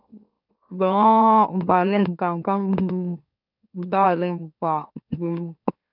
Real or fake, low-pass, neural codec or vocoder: fake; 5.4 kHz; autoencoder, 44.1 kHz, a latent of 192 numbers a frame, MeloTTS